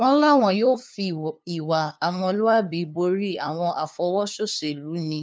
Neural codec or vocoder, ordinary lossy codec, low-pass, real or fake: codec, 16 kHz, 2 kbps, FunCodec, trained on LibriTTS, 25 frames a second; none; none; fake